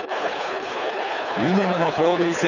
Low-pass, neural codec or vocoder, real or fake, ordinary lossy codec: 7.2 kHz; codec, 24 kHz, 3 kbps, HILCodec; fake; none